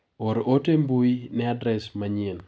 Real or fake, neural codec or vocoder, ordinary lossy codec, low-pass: real; none; none; none